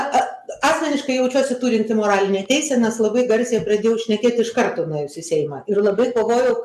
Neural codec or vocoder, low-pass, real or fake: none; 14.4 kHz; real